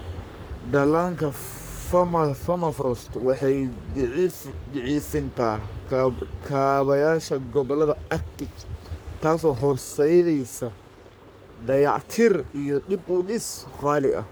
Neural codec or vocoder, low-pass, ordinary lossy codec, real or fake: codec, 44.1 kHz, 3.4 kbps, Pupu-Codec; none; none; fake